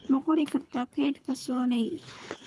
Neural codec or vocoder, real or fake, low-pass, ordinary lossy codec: codec, 24 kHz, 3 kbps, HILCodec; fake; none; none